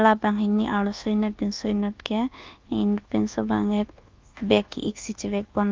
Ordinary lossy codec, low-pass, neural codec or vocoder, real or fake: Opus, 32 kbps; 7.2 kHz; codec, 24 kHz, 1.2 kbps, DualCodec; fake